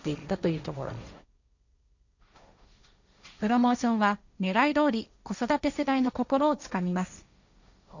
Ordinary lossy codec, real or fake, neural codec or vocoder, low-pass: none; fake; codec, 16 kHz, 1.1 kbps, Voila-Tokenizer; 7.2 kHz